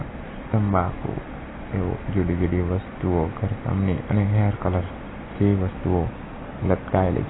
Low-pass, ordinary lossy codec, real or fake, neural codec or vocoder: 7.2 kHz; AAC, 16 kbps; real; none